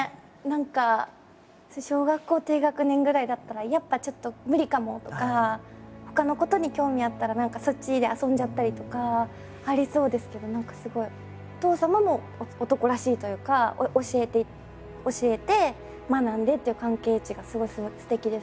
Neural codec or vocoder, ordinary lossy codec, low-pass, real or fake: none; none; none; real